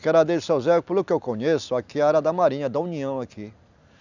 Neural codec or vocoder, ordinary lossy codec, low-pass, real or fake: none; none; 7.2 kHz; real